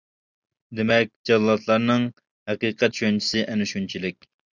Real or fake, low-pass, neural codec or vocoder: real; 7.2 kHz; none